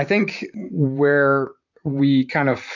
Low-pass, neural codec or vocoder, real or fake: 7.2 kHz; vocoder, 44.1 kHz, 128 mel bands, Pupu-Vocoder; fake